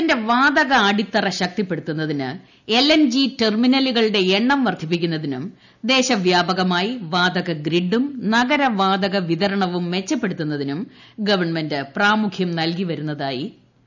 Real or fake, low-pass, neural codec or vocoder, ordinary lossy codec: real; 7.2 kHz; none; none